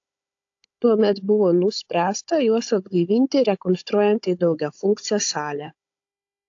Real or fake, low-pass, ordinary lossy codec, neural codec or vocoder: fake; 7.2 kHz; AAC, 48 kbps; codec, 16 kHz, 16 kbps, FunCodec, trained on Chinese and English, 50 frames a second